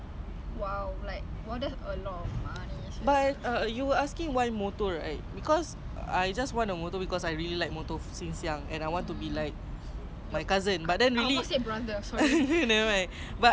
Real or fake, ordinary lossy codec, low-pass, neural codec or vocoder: real; none; none; none